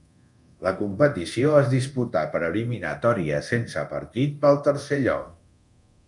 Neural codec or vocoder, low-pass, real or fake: codec, 24 kHz, 0.9 kbps, DualCodec; 10.8 kHz; fake